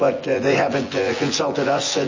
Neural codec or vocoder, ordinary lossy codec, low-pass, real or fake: vocoder, 24 kHz, 100 mel bands, Vocos; MP3, 32 kbps; 7.2 kHz; fake